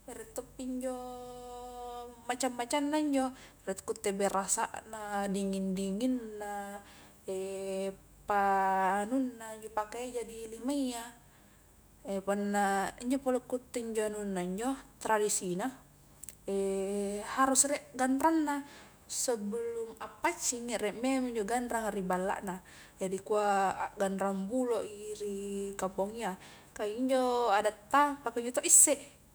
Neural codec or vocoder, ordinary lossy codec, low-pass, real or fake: autoencoder, 48 kHz, 128 numbers a frame, DAC-VAE, trained on Japanese speech; none; none; fake